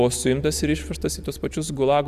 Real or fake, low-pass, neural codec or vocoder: real; 14.4 kHz; none